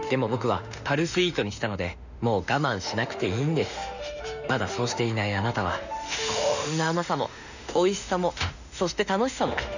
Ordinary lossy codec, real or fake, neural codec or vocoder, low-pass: none; fake; autoencoder, 48 kHz, 32 numbers a frame, DAC-VAE, trained on Japanese speech; 7.2 kHz